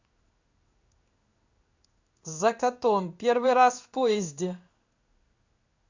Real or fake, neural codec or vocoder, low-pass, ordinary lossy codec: fake; codec, 16 kHz in and 24 kHz out, 1 kbps, XY-Tokenizer; 7.2 kHz; Opus, 64 kbps